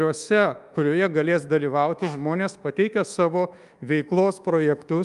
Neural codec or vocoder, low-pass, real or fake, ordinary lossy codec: codec, 24 kHz, 1.2 kbps, DualCodec; 10.8 kHz; fake; Opus, 32 kbps